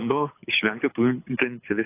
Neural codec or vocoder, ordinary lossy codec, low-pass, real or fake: codec, 24 kHz, 6 kbps, HILCodec; AAC, 24 kbps; 3.6 kHz; fake